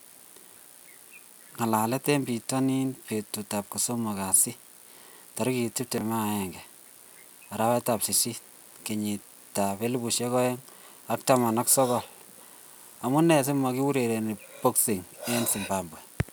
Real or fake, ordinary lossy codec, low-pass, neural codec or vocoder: real; none; none; none